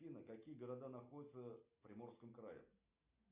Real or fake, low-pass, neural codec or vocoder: real; 3.6 kHz; none